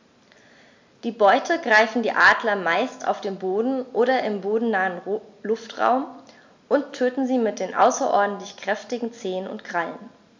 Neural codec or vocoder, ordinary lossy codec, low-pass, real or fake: none; MP3, 64 kbps; 7.2 kHz; real